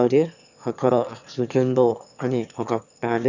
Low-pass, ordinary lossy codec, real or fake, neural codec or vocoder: 7.2 kHz; none; fake; autoencoder, 22.05 kHz, a latent of 192 numbers a frame, VITS, trained on one speaker